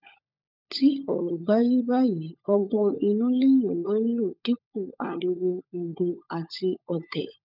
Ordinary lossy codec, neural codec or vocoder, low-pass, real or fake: none; codec, 16 kHz, 16 kbps, FunCodec, trained on LibriTTS, 50 frames a second; 5.4 kHz; fake